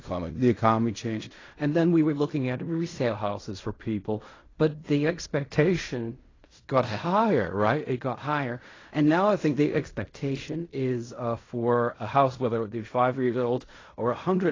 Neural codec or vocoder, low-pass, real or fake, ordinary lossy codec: codec, 16 kHz in and 24 kHz out, 0.4 kbps, LongCat-Audio-Codec, fine tuned four codebook decoder; 7.2 kHz; fake; AAC, 32 kbps